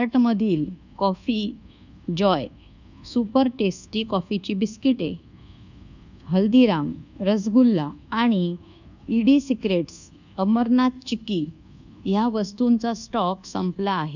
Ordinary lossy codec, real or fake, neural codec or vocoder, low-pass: none; fake; codec, 24 kHz, 1.2 kbps, DualCodec; 7.2 kHz